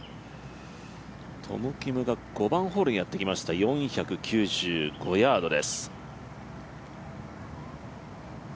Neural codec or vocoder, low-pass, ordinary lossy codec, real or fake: none; none; none; real